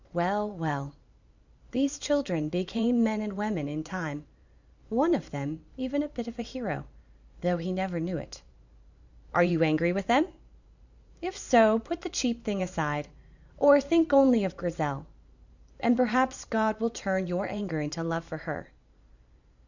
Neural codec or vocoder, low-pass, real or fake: vocoder, 44.1 kHz, 128 mel bands every 512 samples, BigVGAN v2; 7.2 kHz; fake